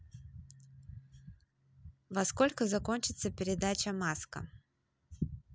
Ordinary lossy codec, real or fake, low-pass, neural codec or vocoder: none; real; none; none